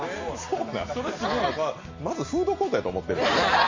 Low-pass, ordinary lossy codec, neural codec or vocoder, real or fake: 7.2 kHz; MP3, 32 kbps; none; real